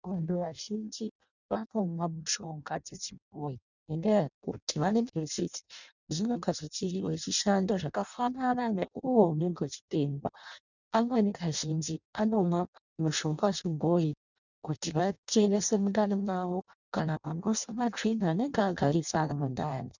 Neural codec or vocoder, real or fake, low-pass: codec, 16 kHz in and 24 kHz out, 0.6 kbps, FireRedTTS-2 codec; fake; 7.2 kHz